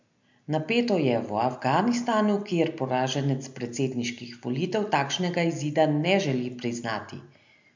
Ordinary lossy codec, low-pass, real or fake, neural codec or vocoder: MP3, 64 kbps; 7.2 kHz; real; none